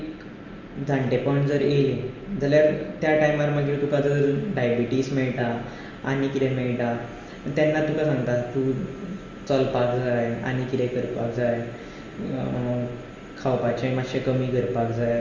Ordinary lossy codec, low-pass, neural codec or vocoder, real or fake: Opus, 32 kbps; 7.2 kHz; none; real